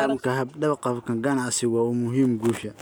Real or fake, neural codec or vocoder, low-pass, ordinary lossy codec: real; none; none; none